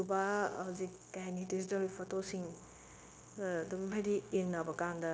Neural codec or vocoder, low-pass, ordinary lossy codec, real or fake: codec, 16 kHz, 0.9 kbps, LongCat-Audio-Codec; none; none; fake